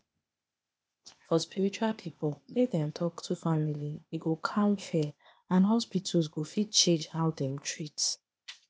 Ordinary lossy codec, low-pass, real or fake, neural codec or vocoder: none; none; fake; codec, 16 kHz, 0.8 kbps, ZipCodec